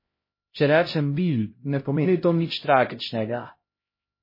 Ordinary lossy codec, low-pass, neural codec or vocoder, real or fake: MP3, 24 kbps; 5.4 kHz; codec, 16 kHz, 0.5 kbps, X-Codec, HuBERT features, trained on LibriSpeech; fake